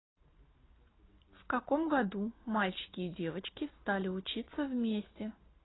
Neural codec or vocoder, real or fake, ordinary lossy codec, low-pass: none; real; AAC, 16 kbps; 7.2 kHz